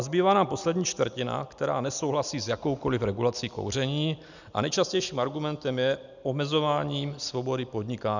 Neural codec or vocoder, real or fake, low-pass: none; real; 7.2 kHz